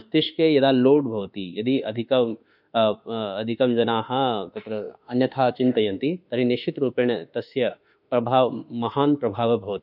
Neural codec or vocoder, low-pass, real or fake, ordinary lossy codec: autoencoder, 48 kHz, 32 numbers a frame, DAC-VAE, trained on Japanese speech; 5.4 kHz; fake; none